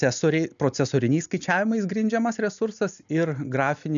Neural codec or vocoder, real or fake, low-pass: none; real; 7.2 kHz